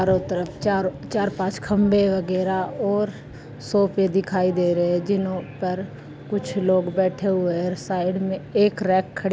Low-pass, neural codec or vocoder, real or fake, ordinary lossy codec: none; none; real; none